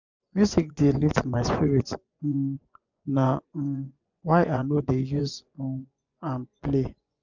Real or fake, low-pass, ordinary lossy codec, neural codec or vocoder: fake; 7.2 kHz; none; vocoder, 24 kHz, 100 mel bands, Vocos